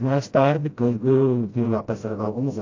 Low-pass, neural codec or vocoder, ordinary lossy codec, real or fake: 7.2 kHz; codec, 16 kHz, 0.5 kbps, FreqCodec, smaller model; AAC, 48 kbps; fake